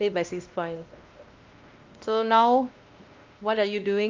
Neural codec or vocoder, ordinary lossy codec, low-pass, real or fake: codec, 16 kHz, 1 kbps, X-Codec, WavLM features, trained on Multilingual LibriSpeech; Opus, 24 kbps; 7.2 kHz; fake